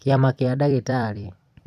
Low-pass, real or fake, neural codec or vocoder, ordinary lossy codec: 14.4 kHz; fake; vocoder, 44.1 kHz, 128 mel bands every 256 samples, BigVGAN v2; none